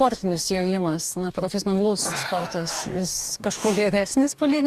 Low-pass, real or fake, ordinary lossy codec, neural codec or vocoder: 14.4 kHz; fake; Opus, 64 kbps; codec, 44.1 kHz, 2.6 kbps, DAC